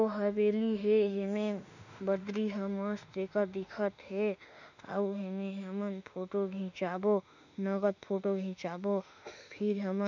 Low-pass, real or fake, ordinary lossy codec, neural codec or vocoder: 7.2 kHz; fake; none; autoencoder, 48 kHz, 32 numbers a frame, DAC-VAE, trained on Japanese speech